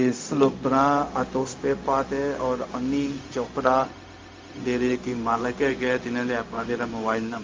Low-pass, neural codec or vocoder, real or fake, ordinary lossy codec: 7.2 kHz; codec, 16 kHz, 0.4 kbps, LongCat-Audio-Codec; fake; Opus, 32 kbps